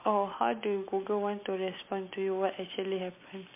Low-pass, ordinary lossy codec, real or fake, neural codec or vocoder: 3.6 kHz; MP3, 24 kbps; real; none